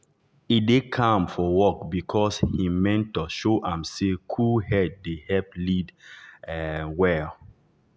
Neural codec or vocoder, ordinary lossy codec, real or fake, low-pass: none; none; real; none